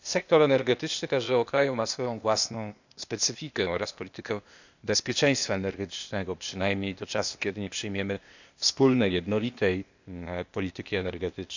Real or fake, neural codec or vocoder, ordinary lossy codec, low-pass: fake; codec, 16 kHz, 0.8 kbps, ZipCodec; none; 7.2 kHz